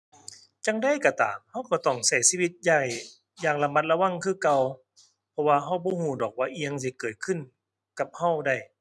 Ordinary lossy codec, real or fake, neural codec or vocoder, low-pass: none; real; none; none